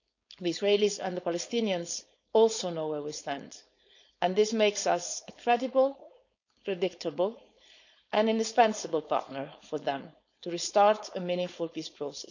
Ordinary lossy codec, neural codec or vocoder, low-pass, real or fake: none; codec, 16 kHz, 4.8 kbps, FACodec; 7.2 kHz; fake